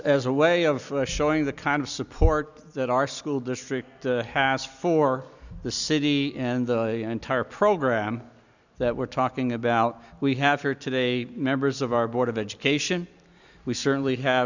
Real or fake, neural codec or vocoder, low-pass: real; none; 7.2 kHz